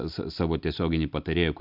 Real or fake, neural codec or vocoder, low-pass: real; none; 5.4 kHz